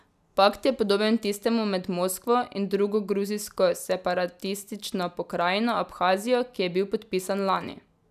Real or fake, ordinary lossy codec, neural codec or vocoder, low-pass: real; none; none; 14.4 kHz